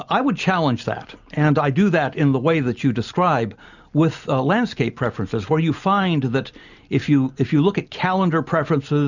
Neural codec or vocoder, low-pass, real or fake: none; 7.2 kHz; real